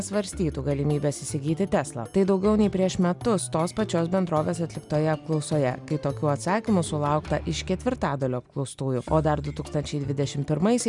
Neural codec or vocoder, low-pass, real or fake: none; 10.8 kHz; real